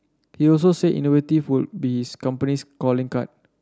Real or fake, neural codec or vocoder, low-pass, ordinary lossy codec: real; none; none; none